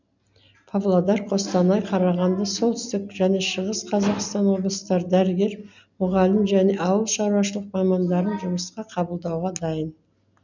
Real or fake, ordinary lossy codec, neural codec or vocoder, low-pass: real; none; none; 7.2 kHz